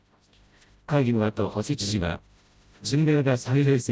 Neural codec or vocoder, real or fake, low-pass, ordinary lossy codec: codec, 16 kHz, 0.5 kbps, FreqCodec, smaller model; fake; none; none